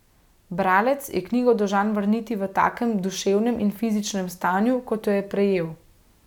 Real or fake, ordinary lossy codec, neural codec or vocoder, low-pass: real; none; none; 19.8 kHz